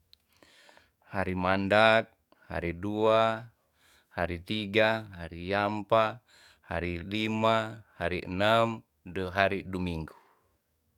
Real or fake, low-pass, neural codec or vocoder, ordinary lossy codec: fake; 19.8 kHz; codec, 44.1 kHz, 7.8 kbps, DAC; none